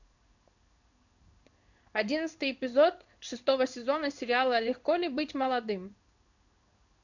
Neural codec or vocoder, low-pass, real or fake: codec, 16 kHz in and 24 kHz out, 1 kbps, XY-Tokenizer; 7.2 kHz; fake